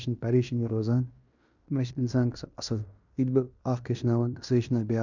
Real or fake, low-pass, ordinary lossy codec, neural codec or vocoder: fake; 7.2 kHz; none; codec, 16 kHz in and 24 kHz out, 0.9 kbps, LongCat-Audio-Codec, fine tuned four codebook decoder